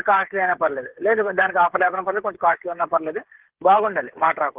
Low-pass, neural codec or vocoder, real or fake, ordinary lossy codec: 3.6 kHz; codec, 16 kHz, 8 kbps, FreqCodec, smaller model; fake; Opus, 16 kbps